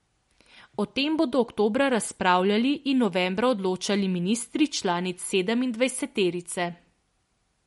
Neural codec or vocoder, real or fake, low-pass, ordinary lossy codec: none; real; 19.8 kHz; MP3, 48 kbps